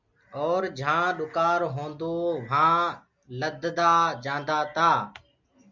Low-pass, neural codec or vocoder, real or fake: 7.2 kHz; none; real